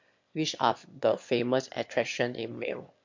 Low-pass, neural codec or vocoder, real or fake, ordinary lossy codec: 7.2 kHz; autoencoder, 22.05 kHz, a latent of 192 numbers a frame, VITS, trained on one speaker; fake; MP3, 48 kbps